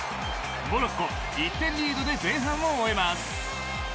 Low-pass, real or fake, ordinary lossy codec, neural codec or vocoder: none; real; none; none